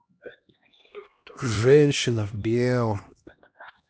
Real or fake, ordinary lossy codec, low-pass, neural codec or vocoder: fake; none; none; codec, 16 kHz, 1 kbps, X-Codec, HuBERT features, trained on LibriSpeech